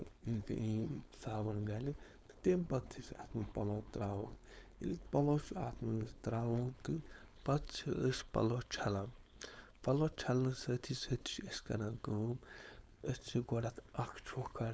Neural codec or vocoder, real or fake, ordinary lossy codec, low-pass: codec, 16 kHz, 4.8 kbps, FACodec; fake; none; none